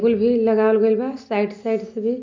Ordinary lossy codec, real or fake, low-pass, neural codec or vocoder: MP3, 64 kbps; real; 7.2 kHz; none